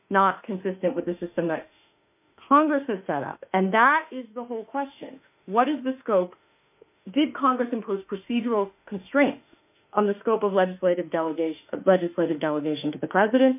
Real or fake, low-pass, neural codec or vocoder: fake; 3.6 kHz; autoencoder, 48 kHz, 32 numbers a frame, DAC-VAE, trained on Japanese speech